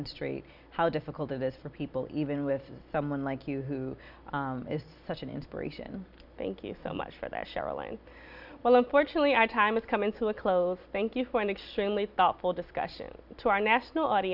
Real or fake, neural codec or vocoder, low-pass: real; none; 5.4 kHz